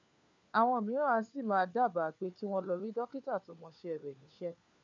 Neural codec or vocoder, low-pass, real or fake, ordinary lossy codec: codec, 16 kHz, 4 kbps, FunCodec, trained on LibriTTS, 50 frames a second; 7.2 kHz; fake; none